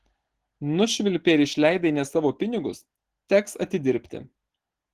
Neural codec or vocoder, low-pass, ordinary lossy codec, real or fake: none; 14.4 kHz; Opus, 16 kbps; real